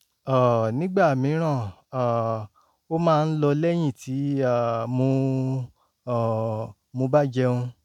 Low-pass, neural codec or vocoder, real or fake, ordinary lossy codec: 19.8 kHz; none; real; none